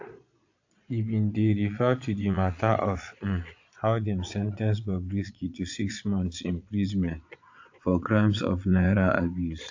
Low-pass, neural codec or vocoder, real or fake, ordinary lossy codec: 7.2 kHz; vocoder, 22.05 kHz, 80 mel bands, Vocos; fake; AAC, 48 kbps